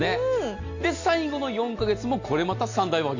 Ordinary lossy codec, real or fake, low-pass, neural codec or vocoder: AAC, 48 kbps; real; 7.2 kHz; none